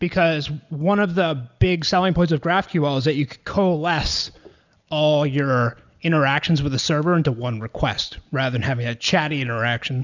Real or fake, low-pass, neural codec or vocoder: real; 7.2 kHz; none